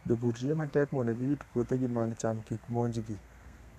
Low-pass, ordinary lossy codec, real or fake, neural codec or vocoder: 14.4 kHz; none; fake; codec, 32 kHz, 1.9 kbps, SNAC